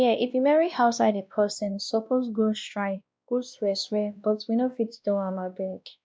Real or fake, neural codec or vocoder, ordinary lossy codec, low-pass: fake; codec, 16 kHz, 1 kbps, X-Codec, WavLM features, trained on Multilingual LibriSpeech; none; none